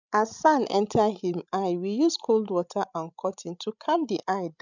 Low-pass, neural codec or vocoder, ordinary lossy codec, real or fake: 7.2 kHz; codec, 16 kHz, 16 kbps, FreqCodec, larger model; none; fake